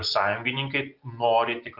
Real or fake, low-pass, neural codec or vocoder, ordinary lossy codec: real; 5.4 kHz; none; Opus, 24 kbps